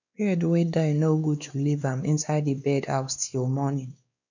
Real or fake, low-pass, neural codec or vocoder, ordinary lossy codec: fake; 7.2 kHz; codec, 16 kHz, 2 kbps, X-Codec, WavLM features, trained on Multilingual LibriSpeech; none